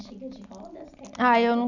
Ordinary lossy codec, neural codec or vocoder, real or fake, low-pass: none; none; real; 7.2 kHz